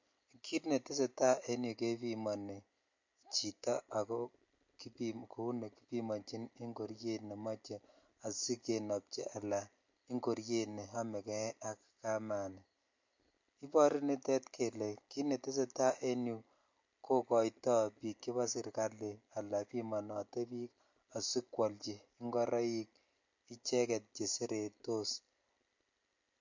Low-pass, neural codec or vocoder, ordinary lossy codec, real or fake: 7.2 kHz; none; MP3, 48 kbps; real